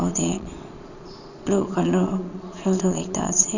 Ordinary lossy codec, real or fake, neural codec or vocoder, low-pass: none; fake; vocoder, 22.05 kHz, 80 mel bands, Vocos; 7.2 kHz